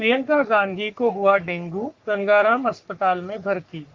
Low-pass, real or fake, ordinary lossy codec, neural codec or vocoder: 7.2 kHz; fake; Opus, 24 kbps; codec, 44.1 kHz, 3.4 kbps, Pupu-Codec